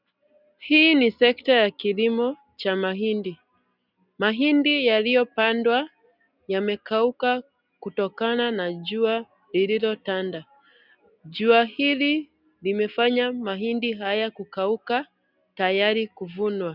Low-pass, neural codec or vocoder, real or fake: 5.4 kHz; none; real